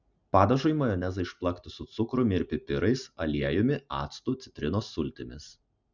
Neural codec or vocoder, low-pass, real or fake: none; 7.2 kHz; real